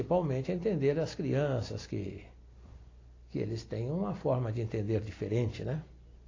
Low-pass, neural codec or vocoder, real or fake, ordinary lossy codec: 7.2 kHz; none; real; AAC, 32 kbps